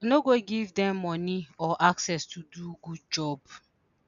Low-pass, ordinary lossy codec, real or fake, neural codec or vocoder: 7.2 kHz; none; real; none